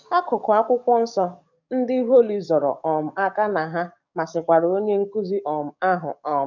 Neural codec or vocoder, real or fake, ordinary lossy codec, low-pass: codec, 44.1 kHz, 7.8 kbps, DAC; fake; none; 7.2 kHz